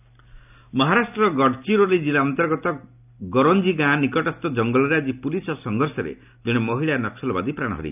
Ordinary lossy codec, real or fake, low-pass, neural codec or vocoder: none; real; 3.6 kHz; none